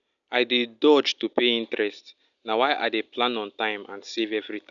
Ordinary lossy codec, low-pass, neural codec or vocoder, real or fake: none; 7.2 kHz; none; real